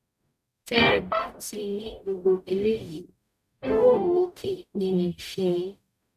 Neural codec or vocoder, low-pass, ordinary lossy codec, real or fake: codec, 44.1 kHz, 0.9 kbps, DAC; 14.4 kHz; none; fake